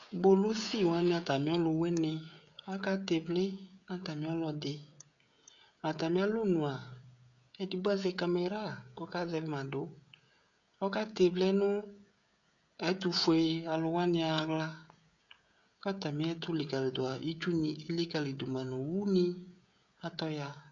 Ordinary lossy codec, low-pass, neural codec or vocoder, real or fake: MP3, 96 kbps; 7.2 kHz; codec, 16 kHz, 16 kbps, FreqCodec, smaller model; fake